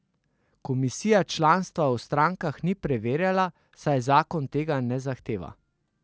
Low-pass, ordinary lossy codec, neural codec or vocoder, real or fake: none; none; none; real